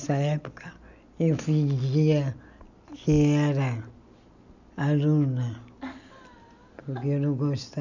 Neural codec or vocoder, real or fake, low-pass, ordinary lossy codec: none; real; 7.2 kHz; none